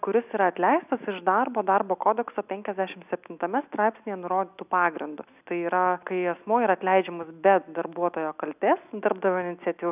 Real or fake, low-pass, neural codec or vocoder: real; 3.6 kHz; none